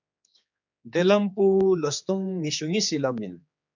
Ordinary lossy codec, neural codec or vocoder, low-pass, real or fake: MP3, 64 kbps; codec, 16 kHz, 4 kbps, X-Codec, HuBERT features, trained on general audio; 7.2 kHz; fake